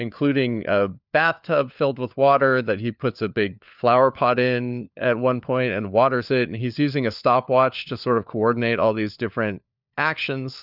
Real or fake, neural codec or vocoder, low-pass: fake; codec, 16 kHz, 4 kbps, FunCodec, trained on LibriTTS, 50 frames a second; 5.4 kHz